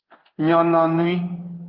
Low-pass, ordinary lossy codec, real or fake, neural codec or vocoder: 5.4 kHz; Opus, 32 kbps; fake; codec, 16 kHz in and 24 kHz out, 1 kbps, XY-Tokenizer